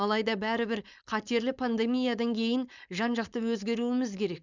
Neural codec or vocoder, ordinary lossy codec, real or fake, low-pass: codec, 16 kHz, 4.8 kbps, FACodec; none; fake; 7.2 kHz